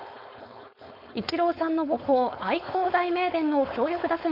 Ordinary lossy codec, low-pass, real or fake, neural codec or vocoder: none; 5.4 kHz; fake; codec, 16 kHz, 4.8 kbps, FACodec